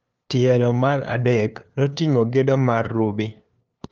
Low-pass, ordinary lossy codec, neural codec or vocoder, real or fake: 7.2 kHz; Opus, 24 kbps; codec, 16 kHz, 2 kbps, FunCodec, trained on LibriTTS, 25 frames a second; fake